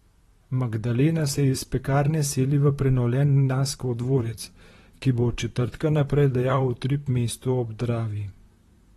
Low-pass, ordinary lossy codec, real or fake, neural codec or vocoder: 19.8 kHz; AAC, 32 kbps; fake; vocoder, 44.1 kHz, 128 mel bands, Pupu-Vocoder